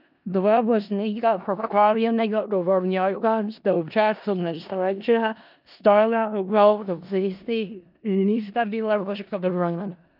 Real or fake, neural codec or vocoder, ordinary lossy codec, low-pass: fake; codec, 16 kHz in and 24 kHz out, 0.4 kbps, LongCat-Audio-Codec, four codebook decoder; none; 5.4 kHz